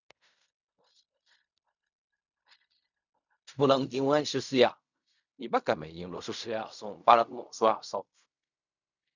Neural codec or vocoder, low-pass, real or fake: codec, 16 kHz in and 24 kHz out, 0.4 kbps, LongCat-Audio-Codec, fine tuned four codebook decoder; 7.2 kHz; fake